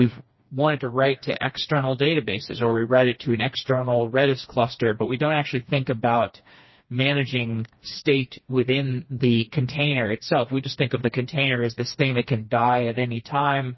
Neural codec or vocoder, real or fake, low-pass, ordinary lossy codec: codec, 16 kHz, 2 kbps, FreqCodec, smaller model; fake; 7.2 kHz; MP3, 24 kbps